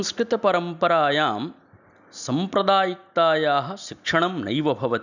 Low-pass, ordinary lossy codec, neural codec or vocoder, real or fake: 7.2 kHz; none; none; real